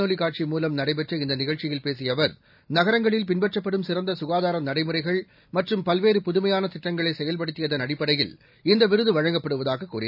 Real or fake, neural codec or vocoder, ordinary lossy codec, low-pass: real; none; none; 5.4 kHz